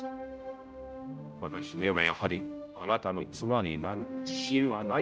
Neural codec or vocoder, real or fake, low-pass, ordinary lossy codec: codec, 16 kHz, 0.5 kbps, X-Codec, HuBERT features, trained on general audio; fake; none; none